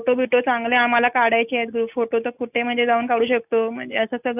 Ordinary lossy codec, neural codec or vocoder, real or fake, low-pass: none; none; real; 3.6 kHz